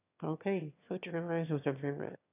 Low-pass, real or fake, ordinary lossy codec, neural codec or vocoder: 3.6 kHz; fake; none; autoencoder, 22.05 kHz, a latent of 192 numbers a frame, VITS, trained on one speaker